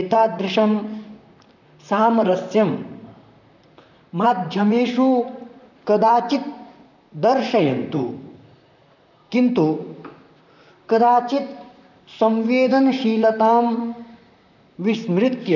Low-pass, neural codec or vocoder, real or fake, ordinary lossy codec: 7.2 kHz; vocoder, 44.1 kHz, 128 mel bands, Pupu-Vocoder; fake; none